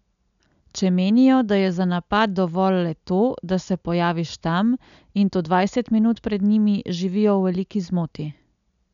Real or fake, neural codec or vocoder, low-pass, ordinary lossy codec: real; none; 7.2 kHz; none